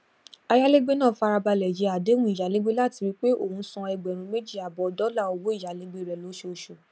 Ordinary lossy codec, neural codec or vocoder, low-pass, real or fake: none; none; none; real